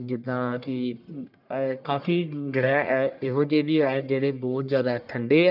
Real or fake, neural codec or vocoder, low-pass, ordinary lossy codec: fake; codec, 44.1 kHz, 1.7 kbps, Pupu-Codec; 5.4 kHz; none